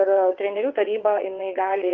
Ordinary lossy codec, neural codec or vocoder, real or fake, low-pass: Opus, 32 kbps; vocoder, 22.05 kHz, 80 mel bands, WaveNeXt; fake; 7.2 kHz